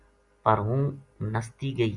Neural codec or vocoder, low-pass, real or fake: none; 10.8 kHz; real